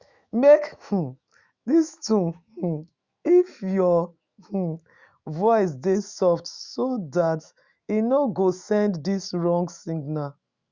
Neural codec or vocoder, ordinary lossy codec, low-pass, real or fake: autoencoder, 48 kHz, 128 numbers a frame, DAC-VAE, trained on Japanese speech; Opus, 64 kbps; 7.2 kHz; fake